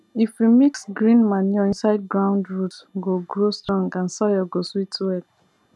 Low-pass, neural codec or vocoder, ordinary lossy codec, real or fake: none; none; none; real